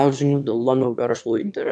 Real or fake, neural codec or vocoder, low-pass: fake; autoencoder, 22.05 kHz, a latent of 192 numbers a frame, VITS, trained on one speaker; 9.9 kHz